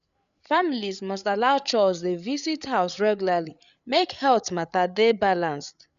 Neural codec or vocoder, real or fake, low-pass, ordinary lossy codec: codec, 16 kHz, 8 kbps, FreqCodec, larger model; fake; 7.2 kHz; none